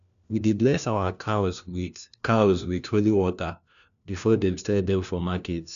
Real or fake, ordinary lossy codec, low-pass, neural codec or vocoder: fake; none; 7.2 kHz; codec, 16 kHz, 1 kbps, FunCodec, trained on LibriTTS, 50 frames a second